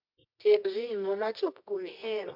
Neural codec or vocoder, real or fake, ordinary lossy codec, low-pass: codec, 24 kHz, 0.9 kbps, WavTokenizer, medium music audio release; fake; MP3, 48 kbps; 5.4 kHz